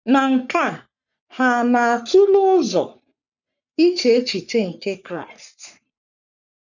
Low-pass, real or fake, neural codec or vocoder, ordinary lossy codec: 7.2 kHz; fake; codec, 44.1 kHz, 3.4 kbps, Pupu-Codec; none